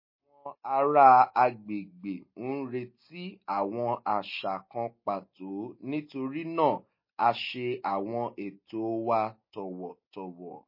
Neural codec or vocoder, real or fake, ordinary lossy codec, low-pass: none; real; MP3, 24 kbps; 5.4 kHz